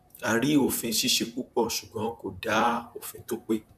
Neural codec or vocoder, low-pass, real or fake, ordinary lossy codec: vocoder, 44.1 kHz, 128 mel bands, Pupu-Vocoder; 14.4 kHz; fake; none